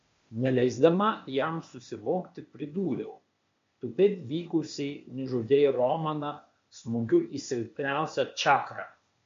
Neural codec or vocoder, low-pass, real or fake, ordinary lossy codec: codec, 16 kHz, 0.8 kbps, ZipCodec; 7.2 kHz; fake; MP3, 48 kbps